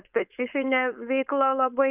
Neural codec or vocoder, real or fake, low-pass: codec, 16 kHz, 4.8 kbps, FACodec; fake; 3.6 kHz